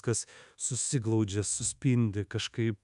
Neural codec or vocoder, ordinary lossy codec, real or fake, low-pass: codec, 24 kHz, 1.2 kbps, DualCodec; MP3, 96 kbps; fake; 10.8 kHz